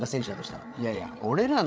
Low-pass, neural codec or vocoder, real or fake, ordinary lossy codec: none; codec, 16 kHz, 16 kbps, FreqCodec, larger model; fake; none